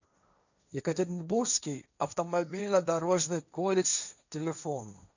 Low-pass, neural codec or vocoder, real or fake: 7.2 kHz; codec, 16 kHz, 1.1 kbps, Voila-Tokenizer; fake